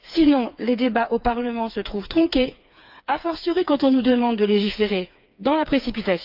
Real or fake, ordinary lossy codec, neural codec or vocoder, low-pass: fake; none; codec, 16 kHz, 4 kbps, FreqCodec, smaller model; 5.4 kHz